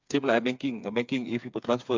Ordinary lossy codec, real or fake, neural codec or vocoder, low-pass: MP3, 64 kbps; fake; codec, 16 kHz, 4 kbps, FreqCodec, smaller model; 7.2 kHz